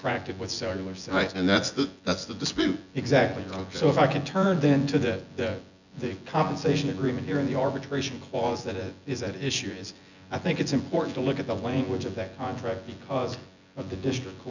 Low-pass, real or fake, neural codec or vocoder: 7.2 kHz; fake; vocoder, 24 kHz, 100 mel bands, Vocos